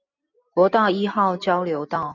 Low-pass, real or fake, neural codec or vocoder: 7.2 kHz; real; none